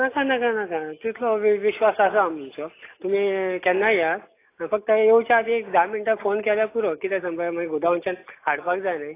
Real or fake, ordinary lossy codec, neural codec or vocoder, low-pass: real; AAC, 24 kbps; none; 3.6 kHz